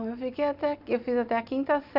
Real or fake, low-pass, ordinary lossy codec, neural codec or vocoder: real; 5.4 kHz; AAC, 48 kbps; none